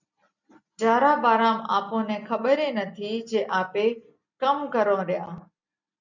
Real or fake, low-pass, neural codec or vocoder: real; 7.2 kHz; none